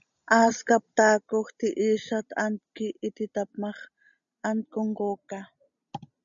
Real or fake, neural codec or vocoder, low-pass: real; none; 7.2 kHz